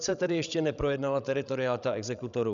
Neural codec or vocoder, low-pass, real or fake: codec, 16 kHz, 16 kbps, FreqCodec, larger model; 7.2 kHz; fake